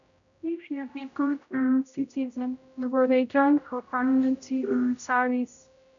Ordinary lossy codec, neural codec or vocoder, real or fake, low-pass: none; codec, 16 kHz, 0.5 kbps, X-Codec, HuBERT features, trained on general audio; fake; 7.2 kHz